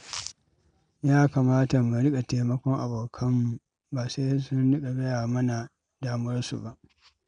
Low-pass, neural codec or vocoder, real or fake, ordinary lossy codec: 9.9 kHz; none; real; none